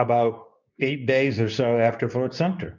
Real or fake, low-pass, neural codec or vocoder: fake; 7.2 kHz; codec, 24 kHz, 0.9 kbps, WavTokenizer, medium speech release version 2